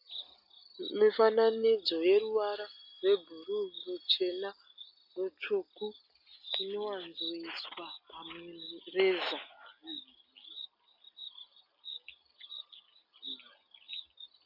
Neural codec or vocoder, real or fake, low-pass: none; real; 5.4 kHz